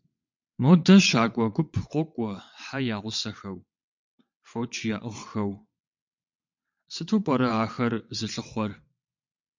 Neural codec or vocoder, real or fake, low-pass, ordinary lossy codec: vocoder, 22.05 kHz, 80 mel bands, WaveNeXt; fake; 7.2 kHz; MP3, 64 kbps